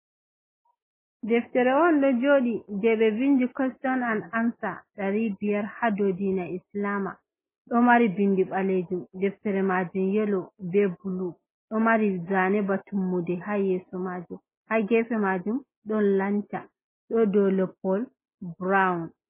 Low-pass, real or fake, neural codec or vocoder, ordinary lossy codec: 3.6 kHz; real; none; MP3, 16 kbps